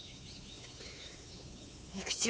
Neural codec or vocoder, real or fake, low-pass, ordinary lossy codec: codec, 16 kHz, 4 kbps, X-Codec, WavLM features, trained on Multilingual LibriSpeech; fake; none; none